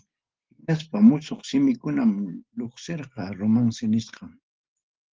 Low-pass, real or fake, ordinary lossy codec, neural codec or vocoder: 7.2 kHz; real; Opus, 16 kbps; none